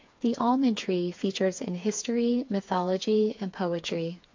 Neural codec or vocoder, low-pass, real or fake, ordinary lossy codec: codec, 16 kHz, 4 kbps, FreqCodec, smaller model; 7.2 kHz; fake; MP3, 48 kbps